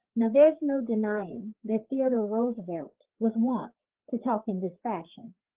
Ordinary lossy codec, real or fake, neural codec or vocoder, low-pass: Opus, 16 kbps; fake; codec, 16 kHz, 4 kbps, FreqCodec, larger model; 3.6 kHz